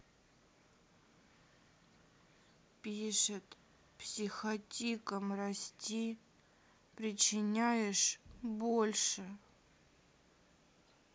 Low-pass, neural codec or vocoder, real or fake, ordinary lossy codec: none; none; real; none